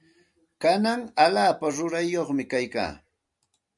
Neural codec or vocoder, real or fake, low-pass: none; real; 10.8 kHz